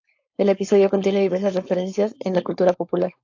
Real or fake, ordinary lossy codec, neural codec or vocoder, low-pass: fake; AAC, 48 kbps; codec, 16 kHz, 4.8 kbps, FACodec; 7.2 kHz